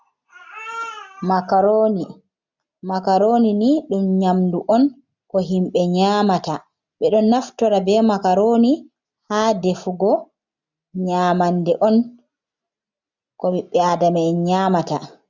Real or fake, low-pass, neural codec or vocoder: real; 7.2 kHz; none